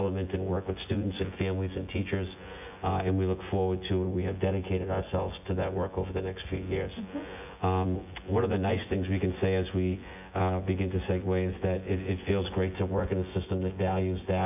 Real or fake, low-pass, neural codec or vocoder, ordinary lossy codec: fake; 3.6 kHz; vocoder, 24 kHz, 100 mel bands, Vocos; AAC, 32 kbps